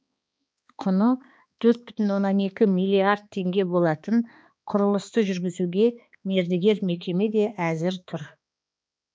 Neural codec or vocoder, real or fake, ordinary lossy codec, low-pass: codec, 16 kHz, 2 kbps, X-Codec, HuBERT features, trained on balanced general audio; fake; none; none